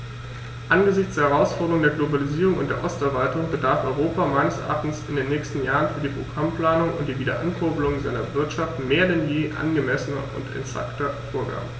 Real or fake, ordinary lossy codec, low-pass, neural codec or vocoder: real; none; none; none